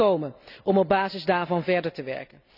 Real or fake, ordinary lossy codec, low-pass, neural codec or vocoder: real; none; 5.4 kHz; none